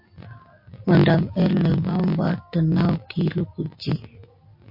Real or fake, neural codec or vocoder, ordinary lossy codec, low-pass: real; none; MP3, 32 kbps; 5.4 kHz